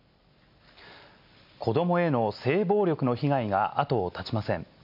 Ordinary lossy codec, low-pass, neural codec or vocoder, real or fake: MP3, 48 kbps; 5.4 kHz; none; real